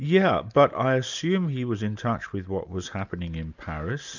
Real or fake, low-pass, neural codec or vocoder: real; 7.2 kHz; none